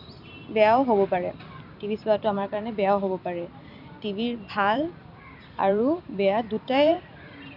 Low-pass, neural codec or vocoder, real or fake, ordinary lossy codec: 5.4 kHz; vocoder, 44.1 kHz, 128 mel bands every 256 samples, BigVGAN v2; fake; none